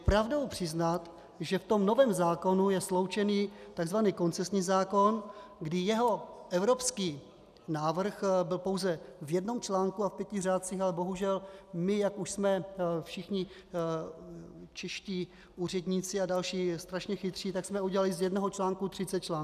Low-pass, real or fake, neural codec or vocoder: 14.4 kHz; real; none